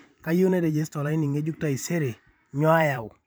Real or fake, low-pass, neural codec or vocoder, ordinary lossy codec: fake; none; vocoder, 44.1 kHz, 128 mel bands every 512 samples, BigVGAN v2; none